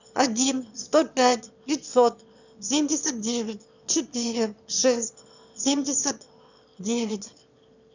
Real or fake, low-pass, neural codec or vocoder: fake; 7.2 kHz; autoencoder, 22.05 kHz, a latent of 192 numbers a frame, VITS, trained on one speaker